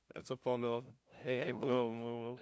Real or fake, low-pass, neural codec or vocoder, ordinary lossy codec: fake; none; codec, 16 kHz, 0.5 kbps, FunCodec, trained on LibriTTS, 25 frames a second; none